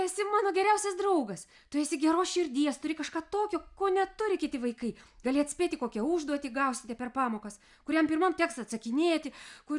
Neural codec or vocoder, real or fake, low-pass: none; real; 10.8 kHz